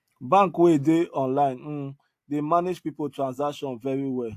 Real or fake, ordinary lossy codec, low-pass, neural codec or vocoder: real; AAC, 64 kbps; 14.4 kHz; none